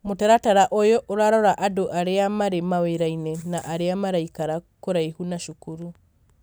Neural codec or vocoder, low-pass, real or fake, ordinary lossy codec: none; none; real; none